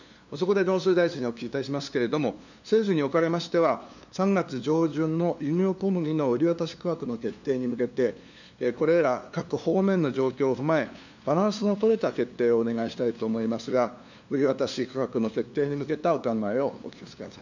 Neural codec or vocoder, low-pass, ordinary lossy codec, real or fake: codec, 16 kHz, 2 kbps, FunCodec, trained on LibriTTS, 25 frames a second; 7.2 kHz; none; fake